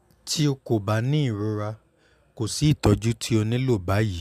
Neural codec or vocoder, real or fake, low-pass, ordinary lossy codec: none; real; 14.4 kHz; MP3, 96 kbps